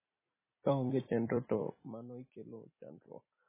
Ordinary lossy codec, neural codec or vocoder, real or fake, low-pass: MP3, 16 kbps; vocoder, 44.1 kHz, 128 mel bands every 256 samples, BigVGAN v2; fake; 3.6 kHz